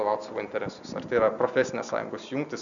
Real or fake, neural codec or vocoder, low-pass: real; none; 7.2 kHz